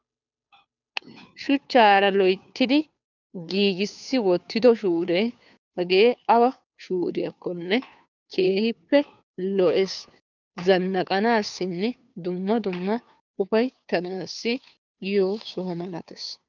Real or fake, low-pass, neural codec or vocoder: fake; 7.2 kHz; codec, 16 kHz, 2 kbps, FunCodec, trained on Chinese and English, 25 frames a second